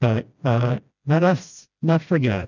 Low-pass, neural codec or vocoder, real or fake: 7.2 kHz; codec, 16 kHz, 1 kbps, FreqCodec, smaller model; fake